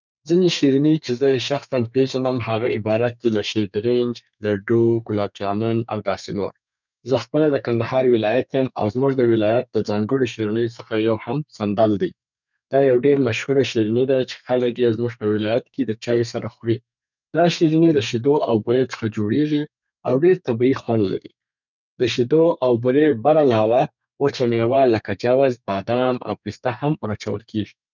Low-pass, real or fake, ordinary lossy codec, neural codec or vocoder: 7.2 kHz; fake; none; codec, 32 kHz, 1.9 kbps, SNAC